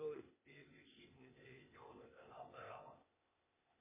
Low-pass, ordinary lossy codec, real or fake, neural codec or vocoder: 3.6 kHz; MP3, 16 kbps; fake; codec, 16 kHz, 0.8 kbps, ZipCodec